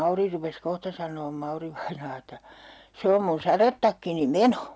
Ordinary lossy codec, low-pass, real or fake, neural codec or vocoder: none; none; real; none